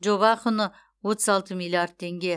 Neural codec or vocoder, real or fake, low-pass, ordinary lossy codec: none; real; none; none